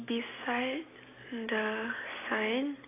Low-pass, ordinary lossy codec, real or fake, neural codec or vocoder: 3.6 kHz; AAC, 16 kbps; real; none